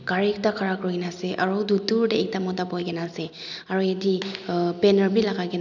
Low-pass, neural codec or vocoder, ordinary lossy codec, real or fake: 7.2 kHz; none; none; real